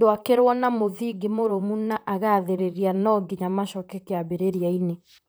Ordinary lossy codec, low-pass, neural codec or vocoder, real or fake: none; none; vocoder, 44.1 kHz, 128 mel bands, Pupu-Vocoder; fake